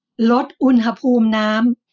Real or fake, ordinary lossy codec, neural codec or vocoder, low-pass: real; none; none; 7.2 kHz